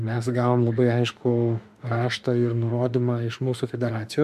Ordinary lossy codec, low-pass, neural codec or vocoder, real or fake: MP3, 96 kbps; 14.4 kHz; autoencoder, 48 kHz, 32 numbers a frame, DAC-VAE, trained on Japanese speech; fake